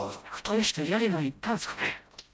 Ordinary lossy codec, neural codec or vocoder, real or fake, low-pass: none; codec, 16 kHz, 0.5 kbps, FreqCodec, smaller model; fake; none